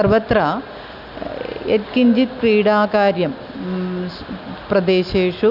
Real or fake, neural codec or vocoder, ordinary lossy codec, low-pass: real; none; none; 5.4 kHz